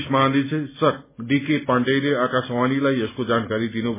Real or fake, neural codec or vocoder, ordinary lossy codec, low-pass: real; none; MP3, 16 kbps; 3.6 kHz